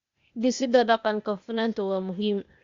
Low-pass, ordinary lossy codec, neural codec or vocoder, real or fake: 7.2 kHz; Opus, 64 kbps; codec, 16 kHz, 0.8 kbps, ZipCodec; fake